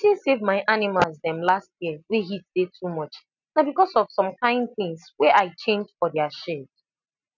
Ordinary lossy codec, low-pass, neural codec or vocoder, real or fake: none; 7.2 kHz; none; real